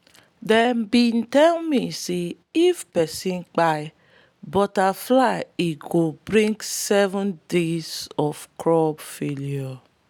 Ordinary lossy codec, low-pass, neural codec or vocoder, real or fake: none; 19.8 kHz; none; real